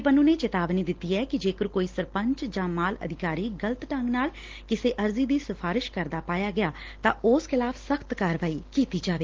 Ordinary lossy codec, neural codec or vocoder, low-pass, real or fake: Opus, 16 kbps; none; 7.2 kHz; real